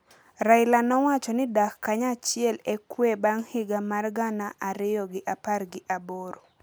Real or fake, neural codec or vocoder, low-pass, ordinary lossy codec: real; none; none; none